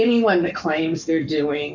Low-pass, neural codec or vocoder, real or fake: 7.2 kHz; codec, 44.1 kHz, 7.8 kbps, Pupu-Codec; fake